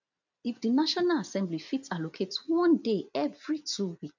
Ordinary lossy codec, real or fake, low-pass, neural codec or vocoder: none; real; 7.2 kHz; none